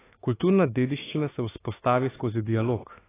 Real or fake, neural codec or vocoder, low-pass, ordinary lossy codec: fake; autoencoder, 48 kHz, 32 numbers a frame, DAC-VAE, trained on Japanese speech; 3.6 kHz; AAC, 16 kbps